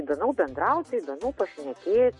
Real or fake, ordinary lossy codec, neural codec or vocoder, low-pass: real; MP3, 48 kbps; none; 10.8 kHz